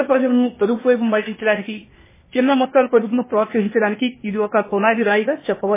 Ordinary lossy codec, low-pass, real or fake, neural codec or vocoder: MP3, 16 kbps; 3.6 kHz; fake; codec, 16 kHz, 0.8 kbps, ZipCodec